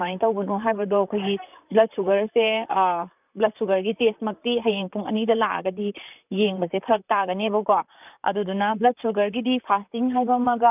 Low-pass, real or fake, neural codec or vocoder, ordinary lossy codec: 3.6 kHz; fake; vocoder, 44.1 kHz, 128 mel bands, Pupu-Vocoder; none